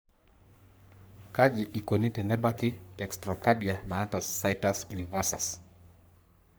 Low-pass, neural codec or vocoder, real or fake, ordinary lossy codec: none; codec, 44.1 kHz, 3.4 kbps, Pupu-Codec; fake; none